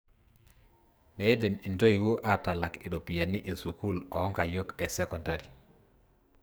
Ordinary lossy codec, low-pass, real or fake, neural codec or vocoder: none; none; fake; codec, 44.1 kHz, 2.6 kbps, SNAC